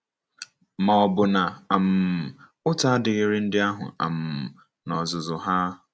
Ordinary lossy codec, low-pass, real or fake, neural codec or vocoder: none; none; real; none